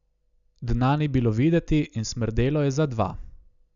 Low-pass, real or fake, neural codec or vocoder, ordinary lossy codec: 7.2 kHz; real; none; none